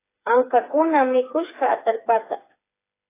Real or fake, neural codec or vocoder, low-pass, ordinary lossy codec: fake; codec, 16 kHz, 4 kbps, FreqCodec, smaller model; 3.6 kHz; AAC, 24 kbps